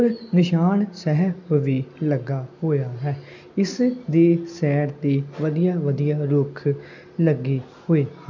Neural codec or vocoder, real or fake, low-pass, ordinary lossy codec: none; real; 7.2 kHz; none